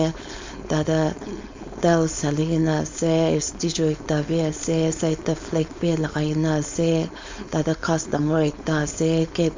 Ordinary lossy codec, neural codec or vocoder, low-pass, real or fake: MP3, 64 kbps; codec, 16 kHz, 4.8 kbps, FACodec; 7.2 kHz; fake